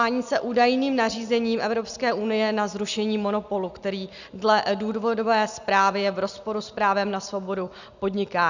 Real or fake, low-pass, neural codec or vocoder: real; 7.2 kHz; none